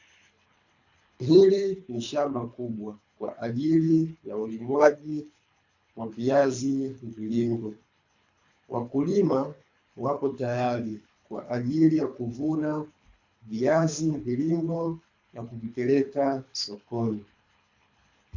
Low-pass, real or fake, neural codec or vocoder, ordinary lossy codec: 7.2 kHz; fake; codec, 24 kHz, 3 kbps, HILCodec; AAC, 48 kbps